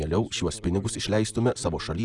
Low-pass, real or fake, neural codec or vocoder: 10.8 kHz; real; none